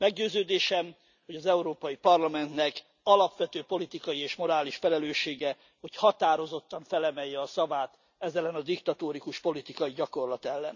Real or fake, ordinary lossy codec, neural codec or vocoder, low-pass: real; none; none; 7.2 kHz